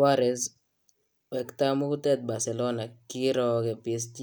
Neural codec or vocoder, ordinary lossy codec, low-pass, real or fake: none; none; none; real